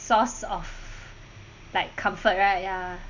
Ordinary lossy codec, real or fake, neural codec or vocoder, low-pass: none; real; none; 7.2 kHz